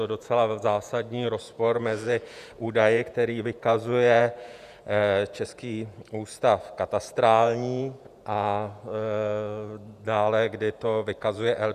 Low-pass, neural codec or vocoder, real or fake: 14.4 kHz; vocoder, 48 kHz, 128 mel bands, Vocos; fake